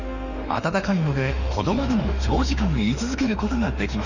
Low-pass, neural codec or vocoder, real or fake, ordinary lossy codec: 7.2 kHz; autoencoder, 48 kHz, 32 numbers a frame, DAC-VAE, trained on Japanese speech; fake; none